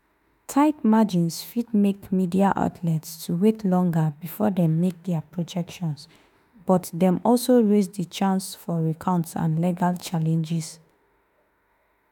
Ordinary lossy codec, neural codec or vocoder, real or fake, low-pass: none; autoencoder, 48 kHz, 32 numbers a frame, DAC-VAE, trained on Japanese speech; fake; none